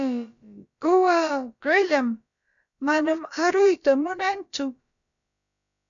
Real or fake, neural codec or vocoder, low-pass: fake; codec, 16 kHz, about 1 kbps, DyCAST, with the encoder's durations; 7.2 kHz